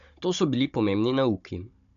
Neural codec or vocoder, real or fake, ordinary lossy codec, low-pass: codec, 16 kHz, 16 kbps, FunCodec, trained on Chinese and English, 50 frames a second; fake; none; 7.2 kHz